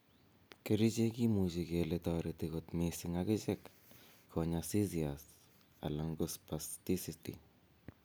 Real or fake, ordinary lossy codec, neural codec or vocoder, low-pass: real; none; none; none